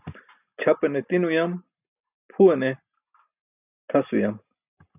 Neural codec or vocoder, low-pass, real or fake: none; 3.6 kHz; real